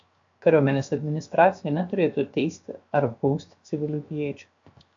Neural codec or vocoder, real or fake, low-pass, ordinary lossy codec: codec, 16 kHz, 0.7 kbps, FocalCodec; fake; 7.2 kHz; AAC, 64 kbps